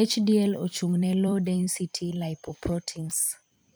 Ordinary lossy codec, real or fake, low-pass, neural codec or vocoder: none; fake; none; vocoder, 44.1 kHz, 128 mel bands every 256 samples, BigVGAN v2